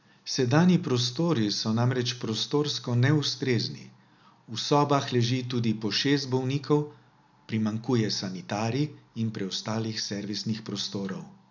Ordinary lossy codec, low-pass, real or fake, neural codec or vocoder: none; 7.2 kHz; real; none